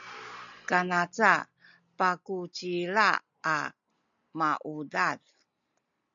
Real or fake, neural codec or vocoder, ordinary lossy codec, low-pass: real; none; MP3, 96 kbps; 7.2 kHz